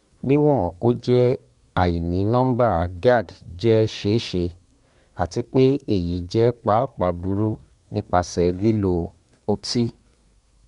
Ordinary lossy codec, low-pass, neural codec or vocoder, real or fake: none; 10.8 kHz; codec, 24 kHz, 1 kbps, SNAC; fake